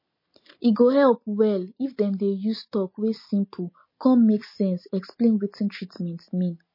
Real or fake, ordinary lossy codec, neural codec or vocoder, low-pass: real; MP3, 24 kbps; none; 5.4 kHz